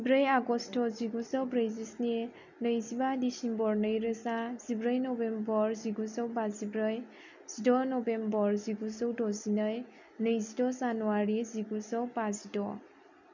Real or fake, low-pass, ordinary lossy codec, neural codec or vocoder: real; 7.2 kHz; none; none